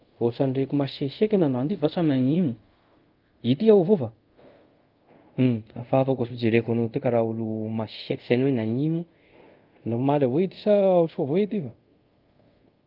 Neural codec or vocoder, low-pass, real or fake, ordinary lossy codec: codec, 24 kHz, 0.5 kbps, DualCodec; 5.4 kHz; fake; Opus, 32 kbps